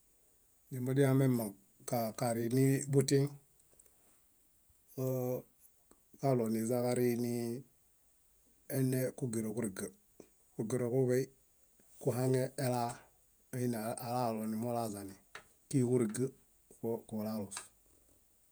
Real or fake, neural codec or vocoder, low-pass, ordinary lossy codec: fake; vocoder, 48 kHz, 128 mel bands, Vocos; none; none